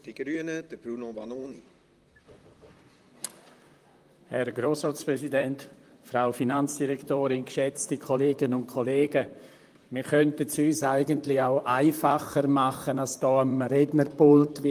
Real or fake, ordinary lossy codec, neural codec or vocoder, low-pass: fake; Opus, 32 kbps; vocoder, 44.1 kHz, 128 mel bands, Pupu-Vocoder; 14.4 kHz